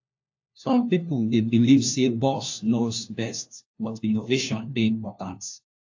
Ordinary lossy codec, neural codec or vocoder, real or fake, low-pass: AAC, 48 kbps; codec, 16 kHz, 1 kbps, FunCodec, trained on LibriTTS, 50 frames a second; fake; 7.2 kHz